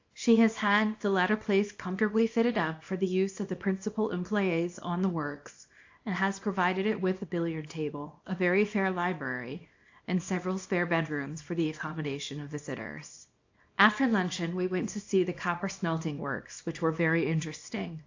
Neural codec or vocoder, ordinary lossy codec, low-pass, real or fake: codec, 24 kHz, 0.9 kbps, WavTokenizer, small release; AAC, 48 kbps; 7.2 kHz; fake